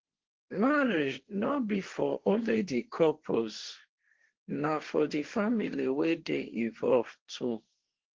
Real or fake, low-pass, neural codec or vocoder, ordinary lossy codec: fake; 7.2 kHz; codec, 16 kHz, 1.1 kbps, Voila-Tokenizer; Opus, 16 kbps